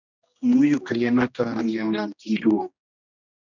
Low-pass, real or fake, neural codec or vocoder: 7.2 kHz; fake; codec, 16 kHz, 1 kbps, X-Codec, HuBERT features, trained on general audio